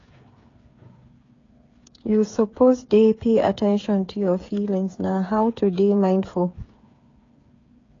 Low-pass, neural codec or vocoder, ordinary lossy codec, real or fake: 7.2 kHz; codec, 16 kHz, 8 kbps, FreqCodec, smaller model; AAC, 32 kbps; fake